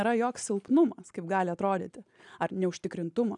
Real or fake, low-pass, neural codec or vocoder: real; 10.8 kHz; none